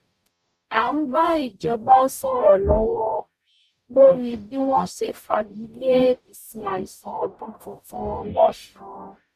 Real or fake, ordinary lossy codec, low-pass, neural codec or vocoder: fake; none; 14.4 kHz; codec, 44.1 kHz, 0.9 kbps, DAC